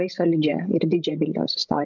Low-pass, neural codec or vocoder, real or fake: 7.2 kHz; codec, 16 kHz, 16 kbps, FreqCodec, larger model; fake